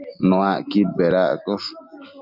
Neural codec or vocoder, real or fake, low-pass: none; real; 5.4 kHz